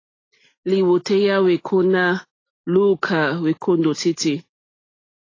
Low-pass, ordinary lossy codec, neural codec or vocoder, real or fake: 7.2 kHz; AAC, 32 kbps; none; real